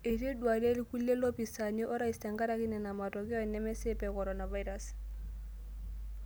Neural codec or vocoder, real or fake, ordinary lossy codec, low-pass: none; real; none; none